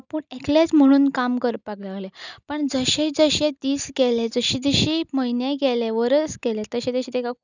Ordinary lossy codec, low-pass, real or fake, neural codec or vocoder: none; 7.2 kHz; real; none